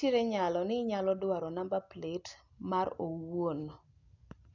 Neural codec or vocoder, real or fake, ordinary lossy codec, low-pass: none; real; none; 7.2 kHz